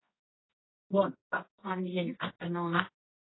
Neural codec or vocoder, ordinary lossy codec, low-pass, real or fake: codec, 24 kHz, 0.9 kbps, WavTokenizer, medium music audio release; AAC, 16 kbps; 7.2 kHz; fake